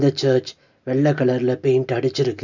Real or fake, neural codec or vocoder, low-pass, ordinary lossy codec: real; none; 7.2 kHz; none